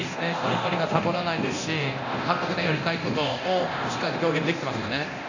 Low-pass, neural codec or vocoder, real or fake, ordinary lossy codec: 7.2 kHz; codec, 24 kHz, 0.9 kbps, DualCodec; fake; none